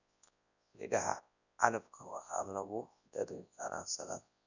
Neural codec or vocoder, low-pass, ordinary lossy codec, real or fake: codec, 24 kHz, 0.9 kbps, WavTokenizer, large speech release; 7.2 kHz; none; fake